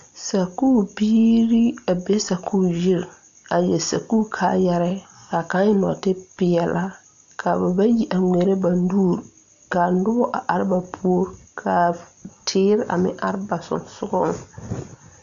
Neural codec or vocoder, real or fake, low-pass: none; real; 7.2 kHz